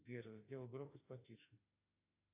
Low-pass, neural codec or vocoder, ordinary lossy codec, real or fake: 3.6 kHz; autoencoder, 48 kHz, 32 numbers a frame, DAC-VAE, trained on Japanese speech; AAC, 32 kbps; fake